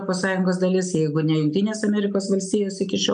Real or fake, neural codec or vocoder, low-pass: real; none; 9.9 kHz